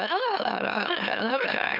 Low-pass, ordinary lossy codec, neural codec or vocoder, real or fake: 5.4 kHz; none; autoencoder, 44.1 kHz, a latent of 192 numbers a frame, MeloTTS; fake